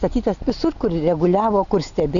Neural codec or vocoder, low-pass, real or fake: none; 7.2 kHz; real